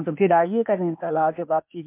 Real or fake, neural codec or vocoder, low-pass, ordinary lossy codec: fake; codec, 16 kHz, 0.8 kbps, ZipCodec; 3.6 kHz; MP3, 32 kbps